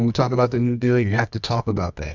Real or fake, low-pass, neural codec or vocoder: fake; 7.2 kHz; codec, 24 kHz, 0.9 kbps, WavTokenizer, medium music audio release